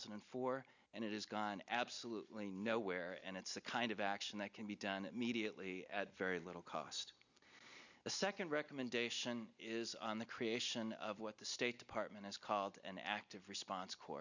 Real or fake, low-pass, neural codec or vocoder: real; 7.2 kHz; none